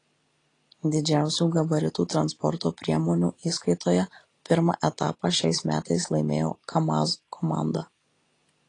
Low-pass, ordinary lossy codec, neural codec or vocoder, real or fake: 10.8 kHz; AAC, 32 kbps; none; real